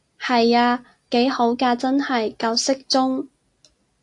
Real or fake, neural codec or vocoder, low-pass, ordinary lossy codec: real; none; 10.8 kHz; AAC, 64 kbps